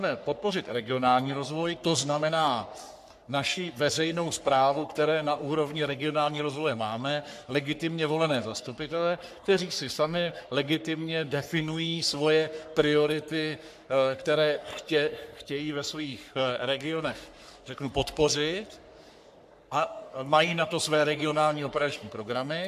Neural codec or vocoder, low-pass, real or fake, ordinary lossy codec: codec, 44.1 kHz, 3.4 kbps, Pupu-Codec; 14.4 kHz; fake; AAC, 96 kbps